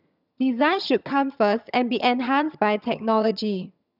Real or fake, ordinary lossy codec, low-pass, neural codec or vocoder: fake; none; 5.4 kHz; vocoder, 22.05 kHz, 80 mel bands, HiFi-GAN